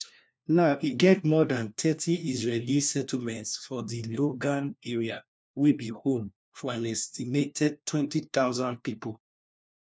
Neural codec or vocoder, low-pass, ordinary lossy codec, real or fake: codec, 16 kHz, 1 kbps, FunCodec, trained on LibriTTS, 50 frames a second; none; none; fake